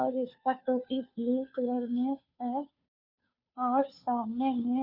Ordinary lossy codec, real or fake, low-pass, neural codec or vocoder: none; fake; 5.4 kHz; codec, 16 kHz, 2 kbps, FunCodec, trained on Chinese and English, 25 frames a second